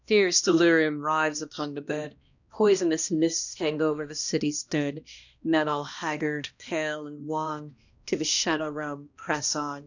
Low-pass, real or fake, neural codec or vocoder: 7.2 kHz; fake; codec, 16 kHz, 1 kbps, X-Codec, HuBERT features, trained on balanced general audio